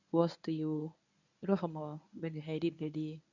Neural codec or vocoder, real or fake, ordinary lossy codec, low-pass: codec, 24 kHz, 0.9 kbps, WavTokenizer, medium speech release version 1; fake; none; 7.2 kHz